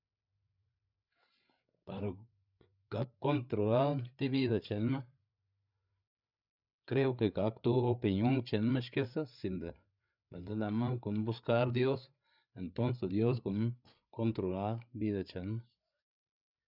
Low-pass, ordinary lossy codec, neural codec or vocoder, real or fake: 5.4 kHz; none; codec, 16 kHz, 8 kbps, FreqCodec, larger model; fake